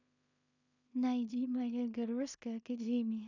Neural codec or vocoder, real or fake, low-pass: codec, 16 kHz in and 24 kHz out, 0.4 kbps, LongCat-Audio-Codec, two codebook decoder; fake; 7.2 kHz